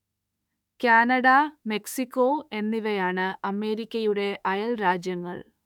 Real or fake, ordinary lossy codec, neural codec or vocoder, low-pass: fake; none; autoencoder, 48 kHz, 32 numbers a frame, DAC-VAE, trained on Japanese speech; 19.8 kHz